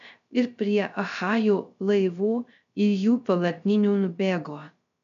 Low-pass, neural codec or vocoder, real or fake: 7.2 kHz; codec, 16 kHz, 0.3 kbps, FocalCodec; fake